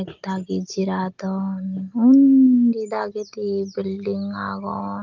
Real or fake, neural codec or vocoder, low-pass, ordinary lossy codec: real; none; 7.2 kHz; Opus, 32 kbps